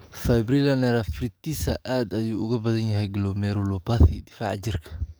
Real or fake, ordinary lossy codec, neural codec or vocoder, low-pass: fake; none; codec, 44.1 kHz, 7.8 kbps, DAC; none